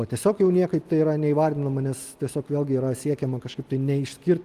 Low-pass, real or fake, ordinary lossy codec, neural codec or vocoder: 14.4 kHz; real; Opus, 24 kbps; none